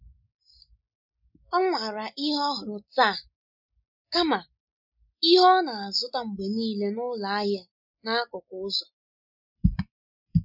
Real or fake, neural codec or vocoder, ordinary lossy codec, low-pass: real; none; none; 5.4 kHz